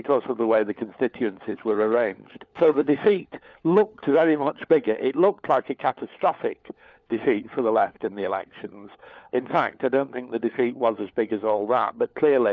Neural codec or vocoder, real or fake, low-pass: codec, 16 kHz, 4 kbps, FunCodec, trained on LibriTTS, 50 frames a second; fake; 7.2 kHz